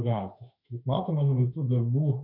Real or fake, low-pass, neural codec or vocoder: fake; 5.4 kHz; autoencoder, 48 kHz, 32 numbers a frame, DAC-VAE, trained on Japanese speech